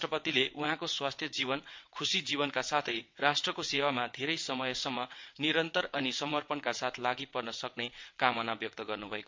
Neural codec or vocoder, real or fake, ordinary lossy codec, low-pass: vocoder, 22.05 kHz, 80 mel bands, WaveNeXt; fake; MP3, 48 kbps; 7.2 kHz